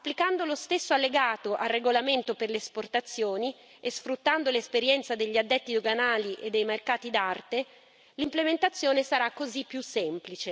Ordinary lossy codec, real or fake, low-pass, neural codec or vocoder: none; real; none; none